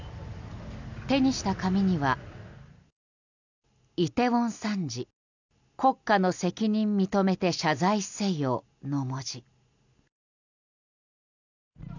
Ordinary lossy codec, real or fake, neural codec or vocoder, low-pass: none; real; none; 7.2 kHz